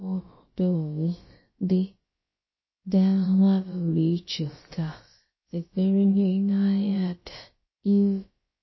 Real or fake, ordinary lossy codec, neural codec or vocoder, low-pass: fake; MP3, 24 kbps; codec, 16 kHz, about 1 kbps, DyCAST, with the encoder's durations; 7.2 kHz